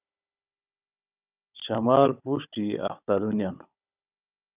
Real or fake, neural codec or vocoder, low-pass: fake; codec, 16 kHz, 16 kbps, FunCodec, trained on Chinese and English, 50 frames a second; 3.6 kHz